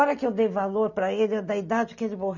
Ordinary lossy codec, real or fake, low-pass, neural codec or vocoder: none; real; 7.2 kHz; none